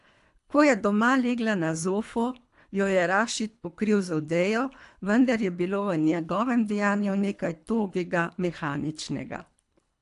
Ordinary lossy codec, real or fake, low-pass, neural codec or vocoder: AAC, 64 kbps; fake; 10.8 kHz; codec, 24 kHz, 3 kbps, HILCodec